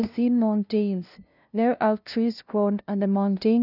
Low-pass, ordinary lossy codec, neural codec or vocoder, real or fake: 5.4 kHz; none; codec, 16 kHz, 0.5 kbps, FunCodec, trained on LibriTTS, 25 frames a second; fake